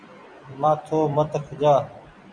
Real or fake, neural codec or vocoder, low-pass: fake; vocoder, 44.1 kHz, 128 mel bands every 256 samples, BigVGAN v2; 9.9 kHz